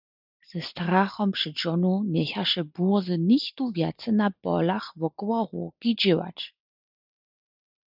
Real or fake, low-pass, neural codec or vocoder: real; 5.4 kHz; none